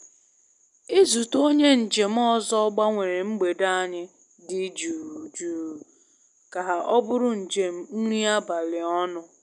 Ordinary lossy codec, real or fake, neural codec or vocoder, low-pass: none; real; none; 10.8 kHz